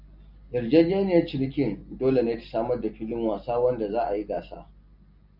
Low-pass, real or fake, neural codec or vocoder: 5.4 kHz; real; none